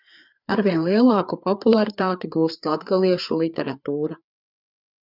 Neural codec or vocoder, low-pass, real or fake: codec, 16 kHz, 4 kbps, FreqCodec, larger model; 5.4 kHz; fake